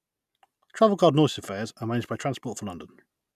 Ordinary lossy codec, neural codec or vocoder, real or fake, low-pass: none; none; real; 14.4 kHz